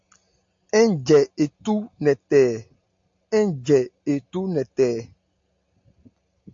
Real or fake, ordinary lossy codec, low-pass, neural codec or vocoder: real; AAC, 64 kbps; 7.2 kHz; none